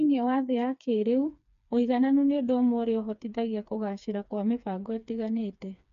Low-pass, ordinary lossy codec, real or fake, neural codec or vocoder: 7.2 kHz; MP3, 64 kbps; fake; codec, 16 kHz, 4 kbps, FreqCodec, smaller model